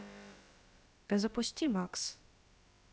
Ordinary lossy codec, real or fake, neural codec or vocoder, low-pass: none; fake; codec, 16 kHz, about 1 kbps, DyCAST, with the encoder's durations; none